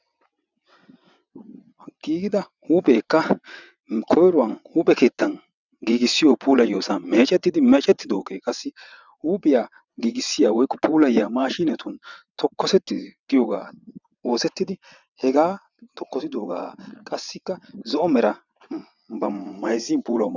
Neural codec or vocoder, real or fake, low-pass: vocoder, 22.05 kHz, 80 mel bands, WaveNeXt; fake; 7.2 kHz